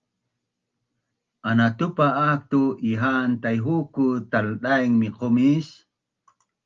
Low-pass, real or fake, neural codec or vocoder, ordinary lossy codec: 7.2 kHz; real; none; Opus, 32 kbps